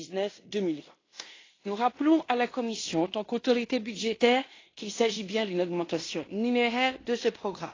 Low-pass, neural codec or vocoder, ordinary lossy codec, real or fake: 7.2 kHz; codec, 16 kHz in and 24 kHz out, 0.9 kbps, LongCat-Audio-Codec, fine tuned four codebook decoder; AAC, 32 kbps; fake